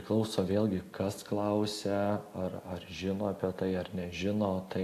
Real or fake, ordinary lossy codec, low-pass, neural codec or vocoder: real; AAC, 64 kbps; 14.4 kHz; none